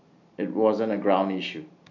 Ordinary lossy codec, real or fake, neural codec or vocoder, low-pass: none; real; none; 7.2 kHz